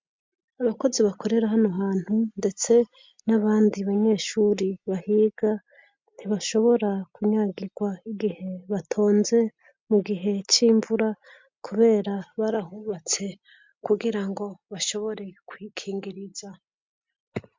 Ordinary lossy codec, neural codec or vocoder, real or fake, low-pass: MP3, 64 kbps; none; real; 7.2 kHz